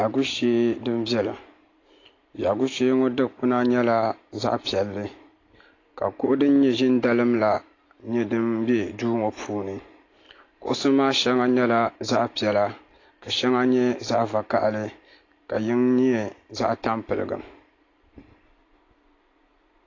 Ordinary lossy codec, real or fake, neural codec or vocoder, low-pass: AAC, 32 kbps; real; none; 7.2 kHz